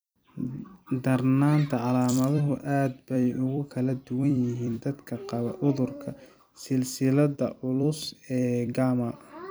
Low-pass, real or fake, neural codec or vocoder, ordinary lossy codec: none; fake; vocoder, 44.1 kHz, 128 mel bands every 256 samples, BigVGAN v2; none